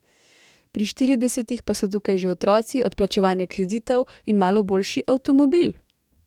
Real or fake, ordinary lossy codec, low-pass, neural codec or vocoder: fake; none; 19.8 kHz; codec, 44.1 kHz, 2.6 kbps, DAC